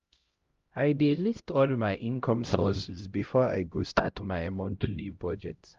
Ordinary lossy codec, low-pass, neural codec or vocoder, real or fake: Opus, 32 kbps; 7.2 kHz; codec, 16 kHz, 0.5 kbps, X-Codec, HuBERT features, trained on LibriSpeech; fake